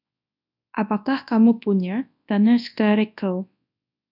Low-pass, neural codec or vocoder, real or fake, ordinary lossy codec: 5.4 kHz; codec, 24 kHz, 0.9 kbps, WavTokenizer, large speech release; fake; AAC, 48 kbps